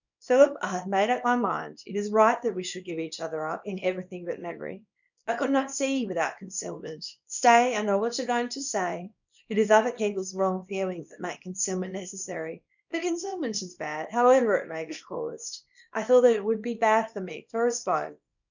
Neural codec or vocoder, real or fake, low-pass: codec, 24 kHz, 0.9 kbps, WavTokenizer, small release; fake; 7.2 kHz